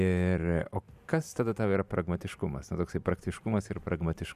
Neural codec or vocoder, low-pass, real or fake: vocoder, 44.1 kHz, 128 mel bands every 512 samples, BigVGAN v2; 14.4 kHz; fake